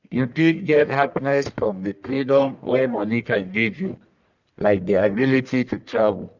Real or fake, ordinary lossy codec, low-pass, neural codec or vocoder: fake; none; 7.2 kHz; codec, 44.1 kHz, 1.7 kbps, Pupu-Codec